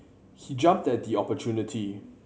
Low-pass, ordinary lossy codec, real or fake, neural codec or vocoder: none; none; real; none